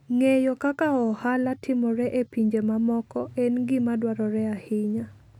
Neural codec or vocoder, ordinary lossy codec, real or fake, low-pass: none; none; real; 19.8 kHz